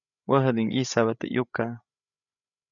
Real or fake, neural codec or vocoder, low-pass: fake; codec, 16 kHz, 8 kbps, FreqCodec, larger model; 7.2 kHz